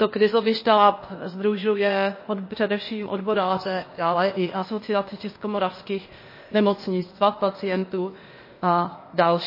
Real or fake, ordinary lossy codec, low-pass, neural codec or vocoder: fake; MP3, 24 kbps; 5.4 kHz; codec, 16 kHz, 0.8 kbps, ZipCodec